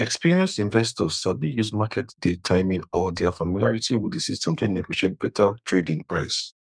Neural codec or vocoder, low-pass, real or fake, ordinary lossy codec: codec, 32 kHz, 1.9 kbps, SNAC; 9.9 kHz; fake; none